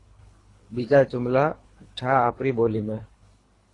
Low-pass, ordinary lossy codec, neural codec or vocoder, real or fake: 10.8 kHz; AAC, 32 kbps; codec, 24 kHz, 3 kbps, HILCodec; fake